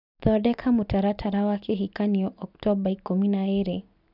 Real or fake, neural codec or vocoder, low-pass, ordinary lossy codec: real; none; 5.4 kHz; none